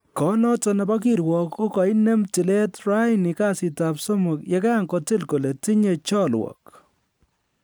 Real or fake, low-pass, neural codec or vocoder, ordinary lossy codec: real; none; none; none